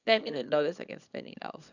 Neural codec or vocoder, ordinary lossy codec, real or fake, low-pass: codec, 24 kHz, 0.9 kbps, WavTokenizer, small release; none; fake; 7.2 kHz